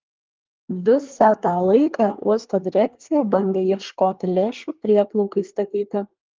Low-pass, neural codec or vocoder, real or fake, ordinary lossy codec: 7.2 kHz; codec, 24 kHz, 1 kbps, SNAC; fake; Opus, 32 kbps